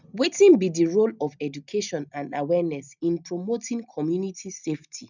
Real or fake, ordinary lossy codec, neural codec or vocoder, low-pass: real; none; none; 7.2 kHz